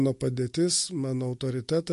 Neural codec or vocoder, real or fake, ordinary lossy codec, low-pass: none; real; MP3, 48 kbps; 14.4 kHz